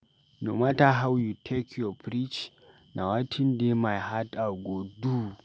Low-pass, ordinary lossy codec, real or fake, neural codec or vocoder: none; none; real; none